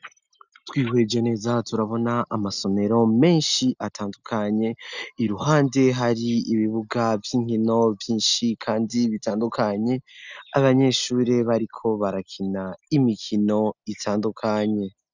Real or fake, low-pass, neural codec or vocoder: real; 7.2 kHz; none